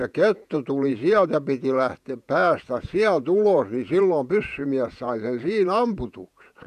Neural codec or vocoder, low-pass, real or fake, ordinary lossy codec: vocoder, 48 kHz, 128 mel bands, Vocos; 14.4 kHz; fake; none